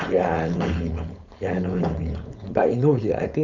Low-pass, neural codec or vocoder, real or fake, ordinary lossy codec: 7.2 kHz; codec, 16 kHz, 4.8 kbps, FACodec; fake; none